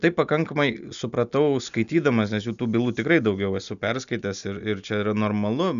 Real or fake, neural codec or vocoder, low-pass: real; none; 7.2 kHz